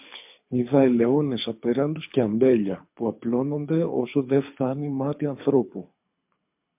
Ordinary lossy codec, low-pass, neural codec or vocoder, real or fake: MP3, 32 kbps; 3.6 kHz; codec, 24 kHz, 6 kbps, HILCodec; fake